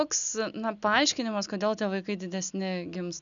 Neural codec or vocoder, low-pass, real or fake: none; 7.2 kHz; real